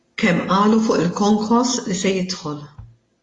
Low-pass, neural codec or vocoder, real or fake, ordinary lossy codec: 10.8 kHz; none; real; AAC, 32 kbps